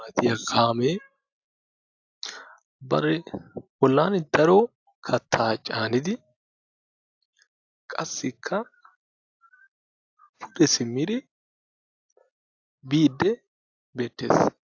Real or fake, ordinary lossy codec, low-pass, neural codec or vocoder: real; AAC, 48 kbps; 7.2 kHz; none